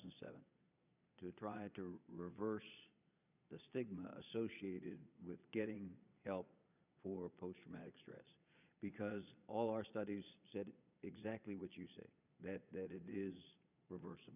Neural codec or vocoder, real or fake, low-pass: vocoder, 22.05 kHz, 80 mel bands, Vocos; fake; 3.6 kHz